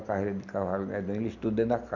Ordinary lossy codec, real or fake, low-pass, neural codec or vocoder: none; real; 7.2 kHz; none